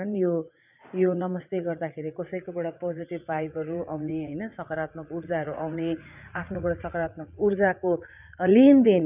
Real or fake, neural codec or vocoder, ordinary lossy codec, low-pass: fake; vocoder, 44.1 kHz, 80 mel bands, Vocos; none; 3.6 kHz